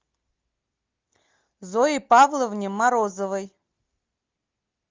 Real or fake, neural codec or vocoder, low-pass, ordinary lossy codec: real; none; 7.2 kHz; Opus, 24 kbps